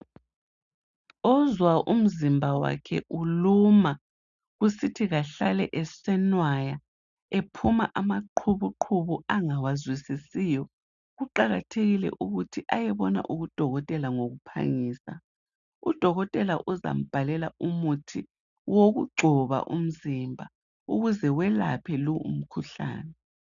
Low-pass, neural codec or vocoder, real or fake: 7.2 kHz; none; real